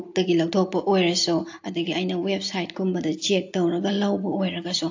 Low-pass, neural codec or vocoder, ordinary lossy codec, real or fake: 7.2 kHz; none; AAC, 48 kbps; real